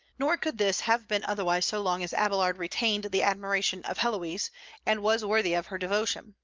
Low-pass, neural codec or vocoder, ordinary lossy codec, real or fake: 7.2 kHz; none; Opus, 24 kbps; real